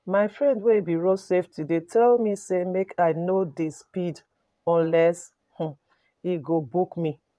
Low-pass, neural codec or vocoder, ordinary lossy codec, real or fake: none; vocoder, 22.05 kHz, 80 mel bands, Vocos; none; fake